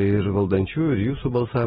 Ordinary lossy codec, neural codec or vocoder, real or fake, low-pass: AAC, 16 kbps; none; real; 19.8 kHz